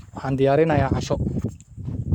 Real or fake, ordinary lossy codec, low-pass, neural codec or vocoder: fake; MP3, 96 kbps; 19.8 kHz; codec, 44.1 kHz, 7.8 kbps, Pupu-Codec